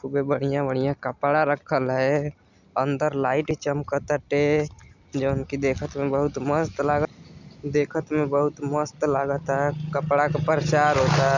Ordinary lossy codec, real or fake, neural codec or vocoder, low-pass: none; real; none; 7.2 kHz